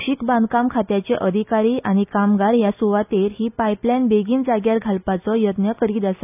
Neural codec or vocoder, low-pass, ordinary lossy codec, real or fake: none; 3.6 kHz; AAC, 32 kbps; real